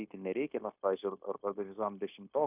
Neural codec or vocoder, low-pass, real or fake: codec, 16 kHz, 0.9 kbps, LongCat-Audio-Codec; 3.6 kHz; fake